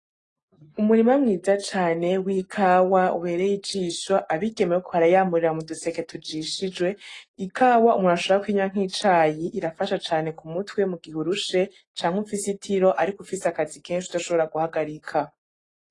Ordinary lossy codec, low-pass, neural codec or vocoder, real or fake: AAC, 32 kbps; 10.8 kHz; none; real